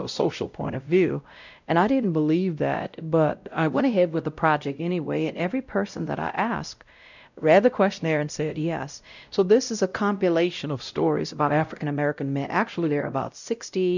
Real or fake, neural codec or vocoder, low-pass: fake; codec, 16 kHz, 0.5 kbps, X-Codec, WavLM features, trained on Multilingual LibriSpeech; 7.2 kHz